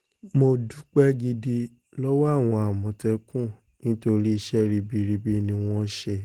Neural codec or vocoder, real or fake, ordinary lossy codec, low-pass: none; real; Opus, 16 kbps; 19.8 kHz